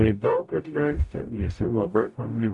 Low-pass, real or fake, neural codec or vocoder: 10.8 kHz; fake; codec, 44.1 kHz, 0.9 kbps, DAC